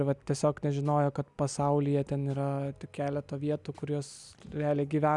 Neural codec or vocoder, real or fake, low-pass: none; real; 10.8 kHz